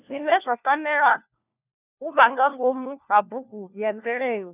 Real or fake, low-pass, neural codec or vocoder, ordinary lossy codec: fake; 3.6 kHz; codec, 16 kHz, 1 kbps, FunCodec, trained on LibriTTS, 50 frames a second; none